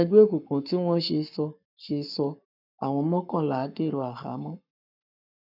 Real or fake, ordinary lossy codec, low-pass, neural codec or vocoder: fake; none; 5.4 kHz; codec, 44.1 kHz, 7.8 kbps, Pupu-Codec